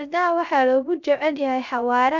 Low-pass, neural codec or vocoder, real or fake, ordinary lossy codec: 7.2 kHz; codec, 16 kHz, 0.3 kbps, FocalCodec; fake; none